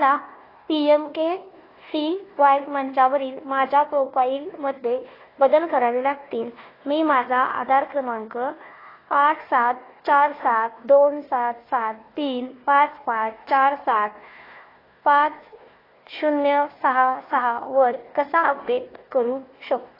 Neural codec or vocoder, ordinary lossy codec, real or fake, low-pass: codec, 16 kHz, 1 kbps, FunCodec, trained on Chinese and English, 50 frames a second; AAC, 24 kbps; fake; 5.4 kHz